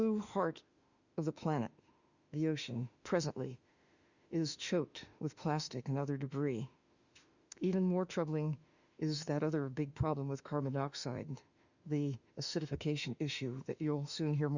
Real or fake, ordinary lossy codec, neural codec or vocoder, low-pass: fake; Opus, 64 kbps; autoencoder, 48 kHz, 32 numbers a frame, DAC-VAE, trained on Japanese speech; 7.2 kHz